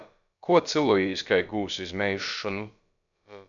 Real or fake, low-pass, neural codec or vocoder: fake; 7.2 kHz; codec, 16 kHz, about 1 kbps, DyCAST, with the encoder's durations